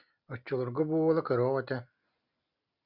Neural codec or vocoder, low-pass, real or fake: none; 5.4 kHz; real